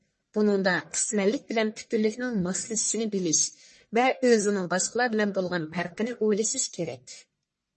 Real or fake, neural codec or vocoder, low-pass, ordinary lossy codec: fake; codec, 44.1 kHz, 1.7 kbps, Pupu-Codec; 10.8 kHz; MP3, 32 kbps